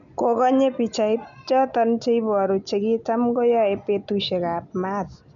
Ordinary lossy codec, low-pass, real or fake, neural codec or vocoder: none; 7.2 kHz; real; none